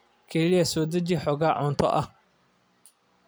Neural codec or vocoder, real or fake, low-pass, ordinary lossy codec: none; real; none; none